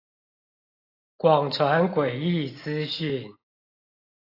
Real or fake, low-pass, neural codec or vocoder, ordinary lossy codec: real; 5.4 kHz; none; AAC, 32 kbps